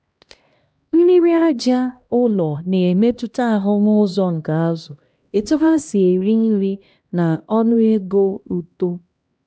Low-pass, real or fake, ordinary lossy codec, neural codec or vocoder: none; fake; none; codec, 16 kHz, 1 kbps, X-Codec, HuBERT features, trained on LibriSpeech